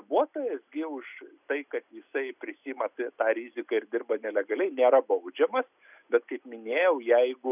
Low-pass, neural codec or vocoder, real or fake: 3.6 kHz; none; real